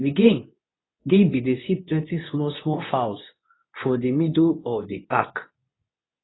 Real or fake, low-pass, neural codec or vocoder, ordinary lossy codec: fake; 7.2 kHz; codec, 24 kHz, 0.9 kbps, WavTokenizer, medium speech release version 2; AAC, 16 kbps